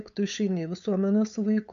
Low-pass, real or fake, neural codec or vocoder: 7.2 kHz; fake; codec, 16 kHz, 8 kbps, FunCodec, trained on LibriTTS, 25 frames a second